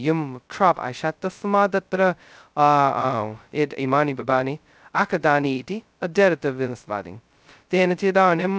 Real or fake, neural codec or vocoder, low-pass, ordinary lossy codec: fake; codec, 16 kHz, 0.2 kbps, FocalCodec; none; none